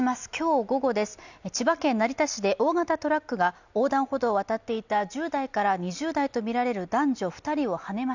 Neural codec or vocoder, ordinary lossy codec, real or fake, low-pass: none; none; real; 7.2 kHz